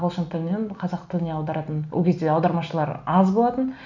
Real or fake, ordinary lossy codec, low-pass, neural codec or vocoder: real; none; 7.2 kHz; none